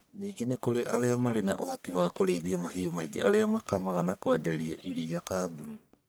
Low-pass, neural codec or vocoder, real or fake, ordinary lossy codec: none; codec, 44.1 kHz, 1.7 kbps, Pupu-Codec; fake; none